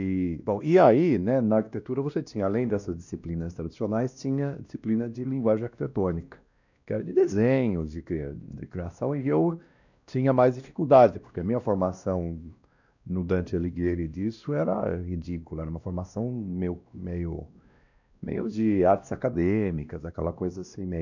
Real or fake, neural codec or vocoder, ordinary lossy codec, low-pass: fake; codec, 16 kHz, 1 kbps, X-Codec, WavLM features, trained on Multilingual LibriSpeech; none; 7.2 kHz